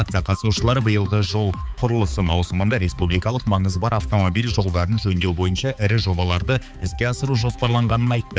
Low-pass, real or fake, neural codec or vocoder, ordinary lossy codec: none; fake; codec, 16 kHz, 4 kbps, X-Codec, HuBERT features, trained on balanced general audio; none